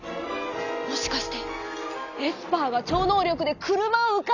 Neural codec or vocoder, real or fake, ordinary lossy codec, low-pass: none; real; none; 7.2 kHz